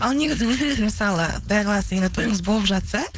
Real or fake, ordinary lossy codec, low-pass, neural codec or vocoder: fake; none; none; codec, 16 kHz, 4.8 kbps, FACodec